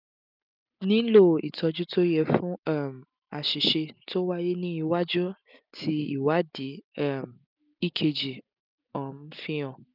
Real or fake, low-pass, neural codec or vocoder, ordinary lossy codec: real; 5.4 kHz; none; none